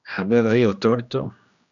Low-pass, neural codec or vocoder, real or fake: 7.2 kHz; codec, 16 kHz, 1 kbps, X-Codec, HuBERT features, trained on general audio; fake